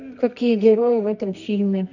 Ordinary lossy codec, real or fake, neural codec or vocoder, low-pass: none; fake; codec, 24 kHz, 0.9 kbps, WavTokenizer, medium music audio release; 7.2 kHz